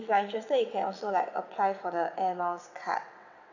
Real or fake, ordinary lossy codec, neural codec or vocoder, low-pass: fake; none; vocoder, 22.05 kHz, 80 mel bands, WaveNeXt; 7.2 kHz